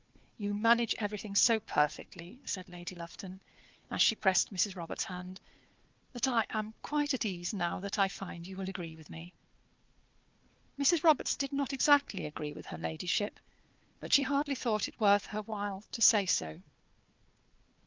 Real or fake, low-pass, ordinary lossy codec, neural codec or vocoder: fake; 7.2 kHz; Opus, 24 kbps; codec, 16 kHz, 4 kbps, FunCodec, trained on Chinese and English, 50 frames a second